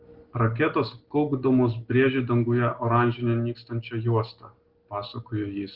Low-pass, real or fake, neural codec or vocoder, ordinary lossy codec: 5.4 kHz; real; none; Opus, 16 kbps